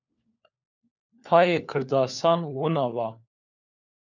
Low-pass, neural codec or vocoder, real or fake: 7.2 kHz; codec, 16 kHz, 4 kbps, FunCodec, trained on LibriTTS, 50 frames a second; fake